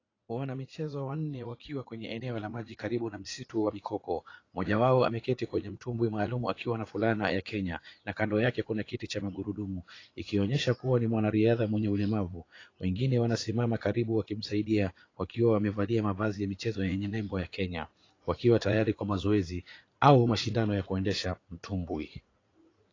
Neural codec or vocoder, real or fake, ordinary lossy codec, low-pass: vocoder, 44.1 kHz, 80 mel bands, Vocos; fake; AAC, 32 kbps; 7.2 kHz